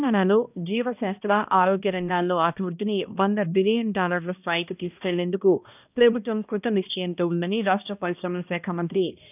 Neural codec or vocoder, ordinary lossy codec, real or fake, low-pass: codec, 16 kHz, 1 kbps, X-Codec, HuBERT features, trained on balanced general audio; none; fake; 3.6 kHz